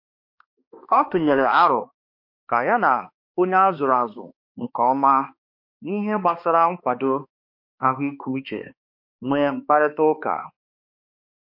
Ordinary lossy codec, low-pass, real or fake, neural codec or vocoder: MP3, 32 kbps; 5.4 kHz; fake; codec, 16 kHz, 2 kbps, X-Codec, HuBERT features, trained on balanced general audio